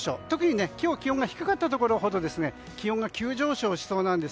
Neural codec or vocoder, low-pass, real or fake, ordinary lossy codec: none; none; real; none